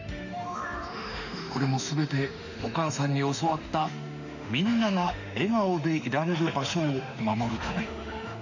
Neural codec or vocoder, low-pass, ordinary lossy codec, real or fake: autoencoder, 48 kHz, 32 numbers a frame, DAC-VAE, trained on Japanese speech; 7.2 kHz; none; fake